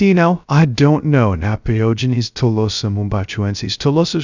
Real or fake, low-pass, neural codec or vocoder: fake; 7.2 kHz; codec, 16 kHz, 0.3 kbps, FocalCodec